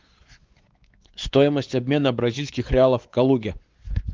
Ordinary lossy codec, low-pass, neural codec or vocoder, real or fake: Opus, 32 kbps; 7.2 kHz; codec, 16 kHz, 4 kbps, X-Codec, WavLM features, trained on Multilingual LibriSpeech; fake